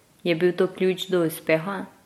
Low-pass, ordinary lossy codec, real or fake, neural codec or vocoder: 19.8 kHz; MP3, 64 kbps; real; none